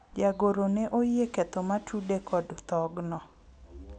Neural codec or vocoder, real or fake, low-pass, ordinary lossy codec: none; real; 9.9 kHz; none